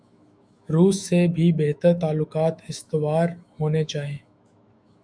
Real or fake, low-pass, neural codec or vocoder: fake; 9.9 kHz; autoencoder, 48 kHz, 128 numbers a frame, DAC-VAE, trained on Japanese speech